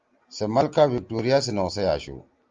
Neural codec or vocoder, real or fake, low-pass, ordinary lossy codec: none; real; 7.2 kHz; Opus, 32 kbps